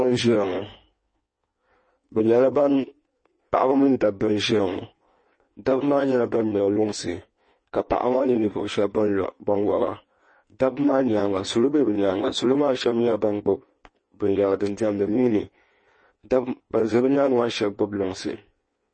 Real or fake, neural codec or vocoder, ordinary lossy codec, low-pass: fake; codec, 16 kHz in and 24 kHz out, 1.1 kbps, FireRedTTS-2 codec; MP3, 32 kbps; 9.9 kHz